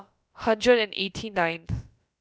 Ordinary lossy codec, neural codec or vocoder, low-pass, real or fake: none; codec, 16 kHz, about 1 kbps, DyCAST, with the encoder's durations; none; fake